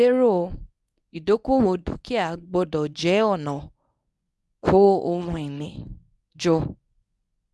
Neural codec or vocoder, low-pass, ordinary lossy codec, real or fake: codec, 24 kHz, 0.9 kbps, WavTokenizer, medium speech release version 1; none; none; fake